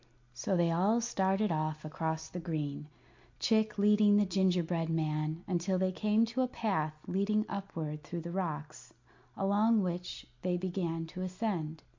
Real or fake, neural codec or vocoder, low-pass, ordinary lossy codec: real; none; 7.2 kHz; MP3, 48 kbps